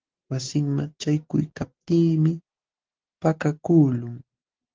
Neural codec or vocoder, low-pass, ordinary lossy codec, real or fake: none; 7.2 kHz; Opus, 16 kbps; real